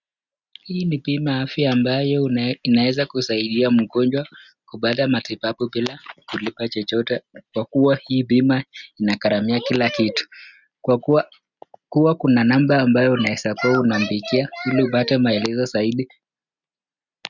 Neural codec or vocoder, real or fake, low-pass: none; real; 7.2 kHz